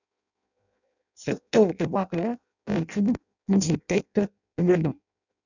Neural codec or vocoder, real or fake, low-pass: codec, 16 kHz in and 24 kHz out, 0.6 kbps, FireRedTTS-2 codec; fake; 7.2 kHz